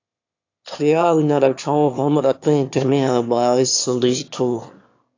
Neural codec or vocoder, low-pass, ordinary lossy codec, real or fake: autoencoder, 22.05 kHz, a latent of 192 numbers a frame, VITS, trained on one speaker; 7.2 kHz; AAC, 48 kbps; fake